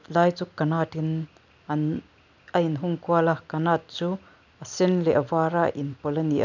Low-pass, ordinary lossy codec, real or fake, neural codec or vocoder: 7.2 kHz; none; real; none